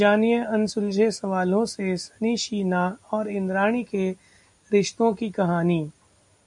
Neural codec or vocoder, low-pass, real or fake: none; 10.8 kHz; real